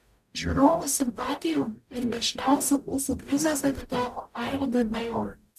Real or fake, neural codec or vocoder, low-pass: fake; codec, 44.1 kHz, 0.9 kbps, DAC; 14.4 kHz